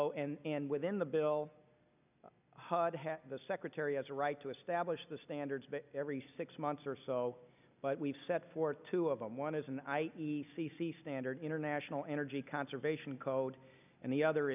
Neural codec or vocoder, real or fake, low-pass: none; real; 3.6 kHz